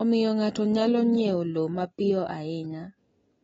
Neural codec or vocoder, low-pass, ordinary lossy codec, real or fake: none; 19.8 kHz; AAC, 24 kbps; real